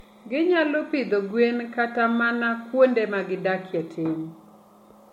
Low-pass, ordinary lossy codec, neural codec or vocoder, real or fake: 19.8 kHz; MP3, 64 kbps; none; real